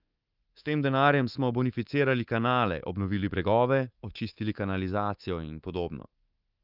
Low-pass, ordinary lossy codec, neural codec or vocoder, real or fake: 5.4 kHz; Opus, 24 kbps; codec, 24 kHz, 3.1 kbps, DualCodec; fake